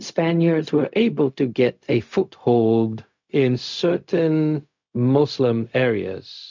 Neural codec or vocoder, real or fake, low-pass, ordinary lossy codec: codec, 16 kHz, 0.4 kbps, LongCat-Audio-Codec; fake; 7.2 kHz; MP3, 64 kbps